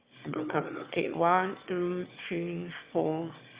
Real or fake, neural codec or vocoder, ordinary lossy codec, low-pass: fake; autoencoder, 22.05 kHz, a latent of 192 numbers a frame, VITS, trained on one speaker; Opus, 64 kbps; 3.6 kHz